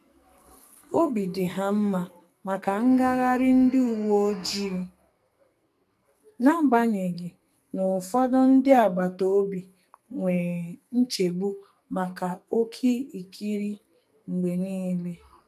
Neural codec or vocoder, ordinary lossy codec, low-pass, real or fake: codec, 44.1 kHz, 2.6 kbps, SNAC; MP3, 96 kbps; 14.4 kHz; fake